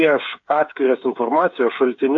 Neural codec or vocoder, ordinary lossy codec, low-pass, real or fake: codec, 16 kHz, 8 kbps, FreqCodec, smaller model; AAC, 48 kbps; 7.2 kHz; fake